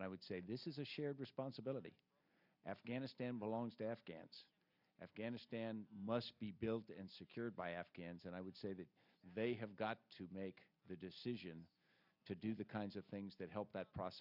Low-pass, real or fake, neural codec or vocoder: 5.4 kHz; real; none